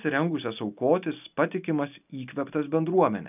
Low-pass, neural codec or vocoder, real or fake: 3.6 kHz; none; real